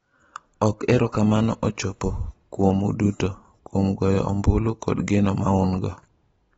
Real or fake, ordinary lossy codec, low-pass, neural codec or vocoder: real; AAC, 24 kbps; 19.8 kHz; none